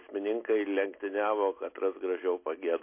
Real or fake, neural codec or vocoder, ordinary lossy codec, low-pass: real; none; MP3, 24 kbps; 3.6 kHz